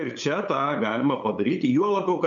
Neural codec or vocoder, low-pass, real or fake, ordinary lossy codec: codec, 16 kHz, 8 kbps, FunCodec, trained on LibriTTS, 25 frames a second; 7.2 kHz; fake; MP3, 96 kbps